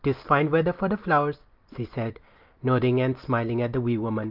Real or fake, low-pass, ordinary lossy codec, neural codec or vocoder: real; 5.4 kHz; Opus, 24 kbps; none